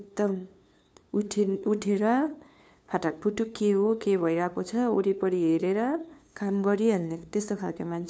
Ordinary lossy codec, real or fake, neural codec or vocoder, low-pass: none; fake; codec, 16 kHz, 2 kbps, FunCodec, trained on LibriTTS, 25 frames a second; none